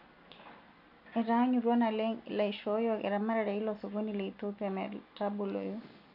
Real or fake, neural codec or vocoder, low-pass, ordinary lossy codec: real; none; 5.4 kHz; none